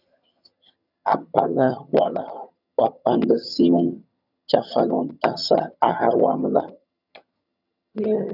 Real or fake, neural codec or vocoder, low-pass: fake; vocoder, 22.05 kHz, 80 mel bands, HiFi-GAN; 5.4 kHz